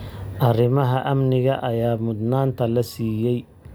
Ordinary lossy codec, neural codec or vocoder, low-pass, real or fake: none; none; none; real